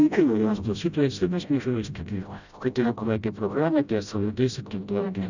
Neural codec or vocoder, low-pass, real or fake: codec, 16 kHz, 0.5 kbps, FreqCodec, smaller model; 7.2 kHz; fake